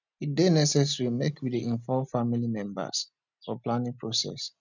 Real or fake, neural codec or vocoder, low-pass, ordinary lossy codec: real; none; 7.2 kHz; none